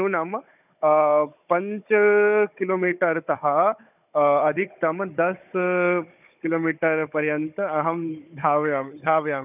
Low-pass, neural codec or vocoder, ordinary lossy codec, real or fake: 3.6 kHz; codec, 16 kHz, 16 kbps, FunCodec, trained on Chinese and English, 50 frames a second; none; fake